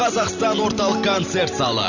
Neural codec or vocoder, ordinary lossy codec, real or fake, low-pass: vocoder, 44.1 kHz, 128 mel bands every 512 samples, BigVGAN v2; none; fake; 7.2 kHz